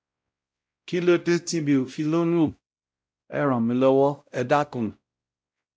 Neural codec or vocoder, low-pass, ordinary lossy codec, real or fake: codec, 16 kHz, 0.5 kbps, X-Codec, WavLM features, trained on Multilingual LibriSpeech; none; none; fake